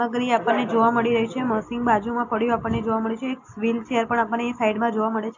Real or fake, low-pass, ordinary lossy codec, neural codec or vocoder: real; 7.2 kHz; AAC, 48 kbps; none